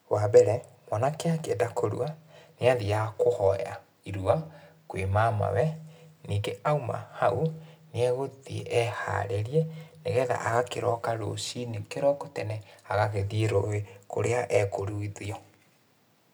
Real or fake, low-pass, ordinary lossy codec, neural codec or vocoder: real; none; none; none